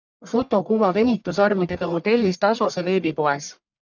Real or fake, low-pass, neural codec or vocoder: fake; 7.2 kHz; codec, 44.1 kHz, 1.7 kbps, Pupu-Codec